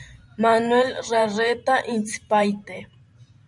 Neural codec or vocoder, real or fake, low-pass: vocoder, 44.1 kHz, 128 mel bands every 512 samples, BigVGAN v2; fake; 10.8 kHz